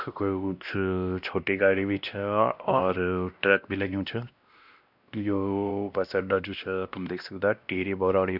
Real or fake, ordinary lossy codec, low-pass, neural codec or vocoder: fake; none; 5.4 kHz; codec, 16 kHz, 1 kbps, X-Codec, WavLM features, trained on Multilingual LibriSpeech